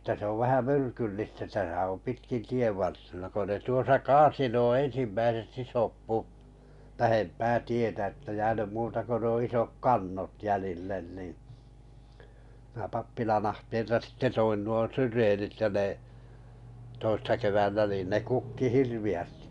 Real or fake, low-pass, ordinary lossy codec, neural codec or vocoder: real; 10.8 kHz; none; none